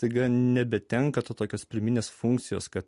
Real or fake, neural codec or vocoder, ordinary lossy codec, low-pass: real; none; MP3, 48 kbps; 10.8 kHz